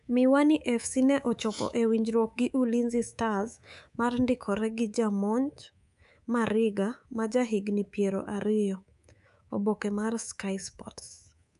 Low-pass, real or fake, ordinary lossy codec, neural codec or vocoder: 10.8 kHz; fake; none; codec, 24 kHz, 3.1 kbps, DualCodec